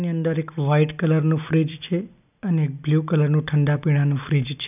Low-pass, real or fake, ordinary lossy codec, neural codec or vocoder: 3.6 kHz; real; none; none